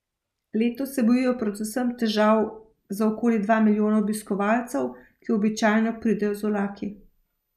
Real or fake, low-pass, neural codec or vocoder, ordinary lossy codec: real; 14.4 kHz; none; none